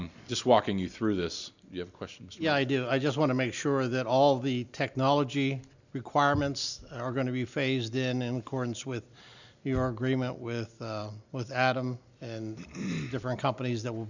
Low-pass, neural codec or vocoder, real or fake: 7.2 kHz; none; real